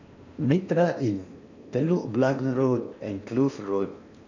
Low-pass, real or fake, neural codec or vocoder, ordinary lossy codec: 7.2 kHz; fake; codec, 16 kHz in and 24 kHz out, 0.8 kbps, FocalCodec, streaming, 65536 codes; none